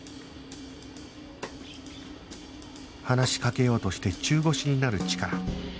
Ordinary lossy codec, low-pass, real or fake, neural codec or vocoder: none; none; real; none